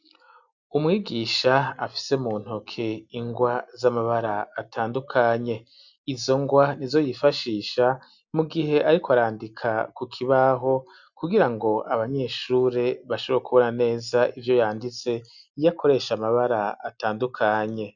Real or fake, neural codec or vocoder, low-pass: real; none; 7.2 kHz